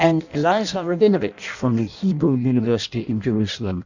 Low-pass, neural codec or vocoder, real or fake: 7.2 kHz; codec, 16 kHz in and 24 kHz out, 0.6 kbps, FireRedTTS-2 codec; fake